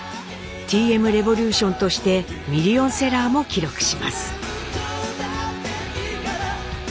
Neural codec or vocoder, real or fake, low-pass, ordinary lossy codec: none; real; none; none